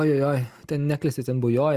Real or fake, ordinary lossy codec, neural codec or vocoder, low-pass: real; Opus, 32 kbps; none; 14.4 kHz